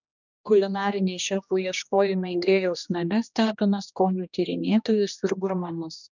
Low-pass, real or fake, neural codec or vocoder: 7.2 kHz; fake; codec, 16 kHz, 1 kbps, X-Codec, HuBERT features, trained on general audio